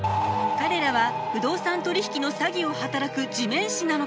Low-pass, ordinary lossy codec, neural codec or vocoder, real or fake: none; none; none; real